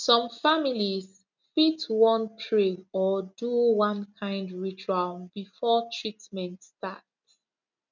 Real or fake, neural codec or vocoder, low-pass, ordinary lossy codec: real; none; 7.2 kHz; none